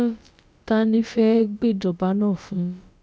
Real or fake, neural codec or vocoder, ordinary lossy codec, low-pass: fake; codec, 16 kHz, about 1 kbps, DyCAST, with the encoder's durations; none; none